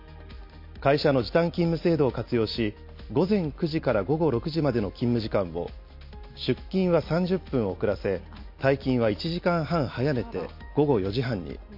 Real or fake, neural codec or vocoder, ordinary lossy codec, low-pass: real; none; MP3, 32 kbps; 5.4 kHz